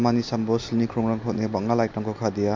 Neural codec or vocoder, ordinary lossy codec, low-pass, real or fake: none; MP3, 48 kbps; 7.2 kHz; real